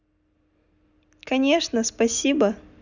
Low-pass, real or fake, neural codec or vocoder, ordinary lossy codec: 7.2 kHz; real; none; none